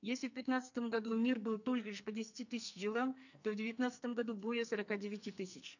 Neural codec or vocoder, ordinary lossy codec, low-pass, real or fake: codec, 44.1 kHz, 2.6 kbps, SNAC; none; 7.2 kHz; fake